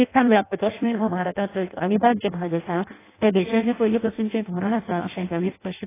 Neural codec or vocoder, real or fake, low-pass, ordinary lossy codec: codec, 16 kHz in and 24 kHz out, 0.6 kbps, FireRedTTS-2 codec; fake; 3.6 kHz; AAC, 16 kbps